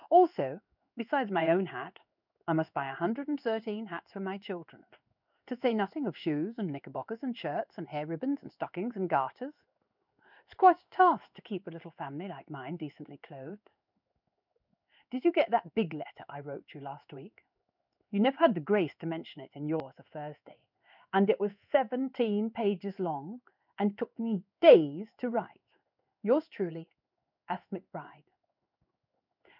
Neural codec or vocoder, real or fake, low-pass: codec, 16 kHz in and 24 kHz out, 1 kbps, XY-Tokenizer; fake; 5.4 kHz